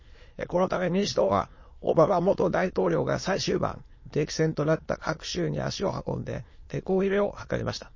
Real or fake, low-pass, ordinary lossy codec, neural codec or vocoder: fake; 7.2 kHz; MP3, 32 kbps; autoencoder, 22.05 kHz, a latent of 192 numbers a frame, VITS, trained on many speakers